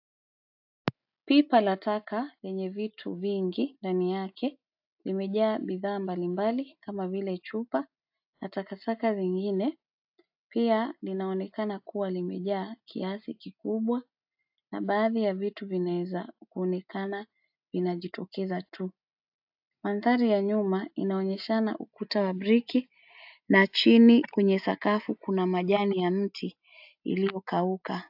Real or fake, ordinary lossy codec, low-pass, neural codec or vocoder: real; AAC, 48 kbps; 5.4 kHz; none